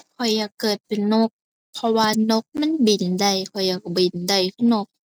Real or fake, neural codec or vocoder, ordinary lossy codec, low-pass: real; none; none; none